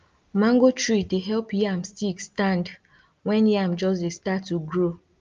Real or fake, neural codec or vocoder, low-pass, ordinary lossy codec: real; none; 7.2 kHz; Opus, 32 kbps